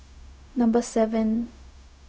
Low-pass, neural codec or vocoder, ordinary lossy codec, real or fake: none; codec, 16 kHz, 0.4 kbps, LongCat-Audio-Codec; none; fake